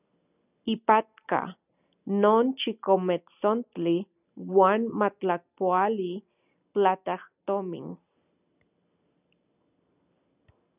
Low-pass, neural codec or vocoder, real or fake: 3.6 kHz; none; real